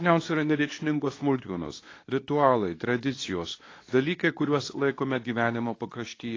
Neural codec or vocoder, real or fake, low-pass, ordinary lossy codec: codec, 24 kHz, 0.9 kbps, WavTokenizer, medium speech release version 2; fake; 7.2 kHz; AAC, 32 kbps